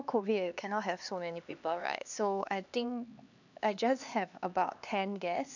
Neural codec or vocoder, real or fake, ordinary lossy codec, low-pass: codec, 16 kHz, 2 kbps, X-Codec, HuBERT features, trained on LibriSpeech; fake; none; 7.2 kHz